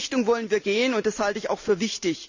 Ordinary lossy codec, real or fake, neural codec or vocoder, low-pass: none; real; none; 7.2 kHz